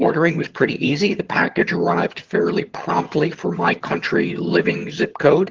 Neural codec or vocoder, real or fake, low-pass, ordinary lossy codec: vocoder, 22.05 kHz, 80 mel bands, HiFi-GAN; fake; 7.2 kHz; Opus, 16 kbps